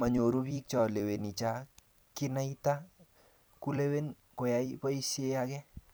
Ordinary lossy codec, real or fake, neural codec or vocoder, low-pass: none; fake; vocoder, 44.1 kHz, 128 mel bands every 256 samples, BigVGAN v2; none